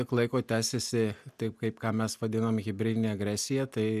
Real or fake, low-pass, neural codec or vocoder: real; 14.4 kHz; none